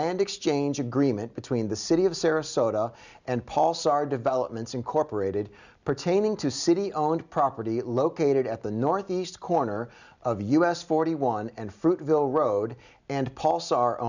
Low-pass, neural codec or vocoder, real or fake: 7.2 kHz; none; real